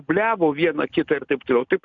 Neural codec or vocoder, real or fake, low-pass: none; real; 7.2 kHz